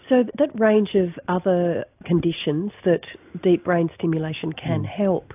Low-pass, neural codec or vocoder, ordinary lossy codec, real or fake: 3.6 kHz; none; AAC, 32 kbps; real